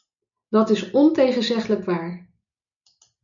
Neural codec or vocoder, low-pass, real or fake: none; 7.2 kHz; real